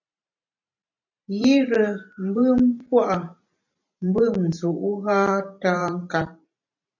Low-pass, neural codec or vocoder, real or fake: 7.2 kHz; vocoder, 44.1 kHz, 128 mel bands every 512 samples, BigVGAN v2; fake